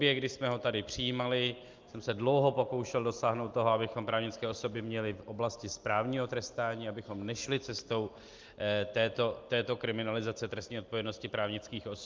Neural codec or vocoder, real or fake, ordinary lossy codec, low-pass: none; real; Opus, 32 kbps; 7.2 kHz